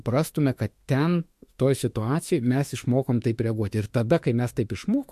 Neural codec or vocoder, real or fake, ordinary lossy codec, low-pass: autoencoder, 48 kHz, 32 numbers a frame, DAC-VAE, trained on Japanese speech; fake; MP3, 64 kbps; 14.4 kHz